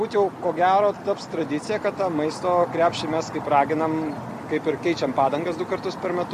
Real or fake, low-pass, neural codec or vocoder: real; 14.4 kHz; none